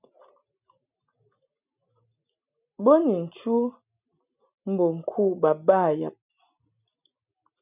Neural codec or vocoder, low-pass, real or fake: none; 3.6 kHz; real